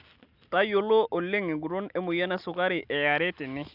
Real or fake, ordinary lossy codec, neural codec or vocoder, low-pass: real; Opus, 64 kbps; none; 5.4 kHz